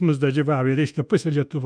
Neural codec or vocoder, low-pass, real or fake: codec, 24 kHz, 1.2 kbps, DualCodec; 9.9 kHz; fake